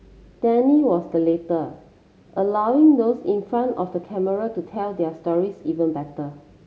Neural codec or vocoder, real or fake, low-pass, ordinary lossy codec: none; real; none; none